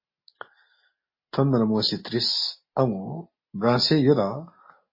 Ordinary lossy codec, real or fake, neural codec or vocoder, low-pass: MP3, 24 kbps; real; none; 5.4 kHz